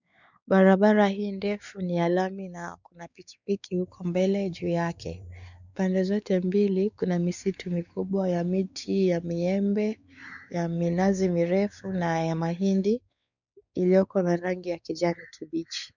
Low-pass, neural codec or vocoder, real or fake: 7.2 kHz; codec, 16 kHz, 4 kbps, X-Codec, WavLM features, trained on Multilingual LibriSpeech; fake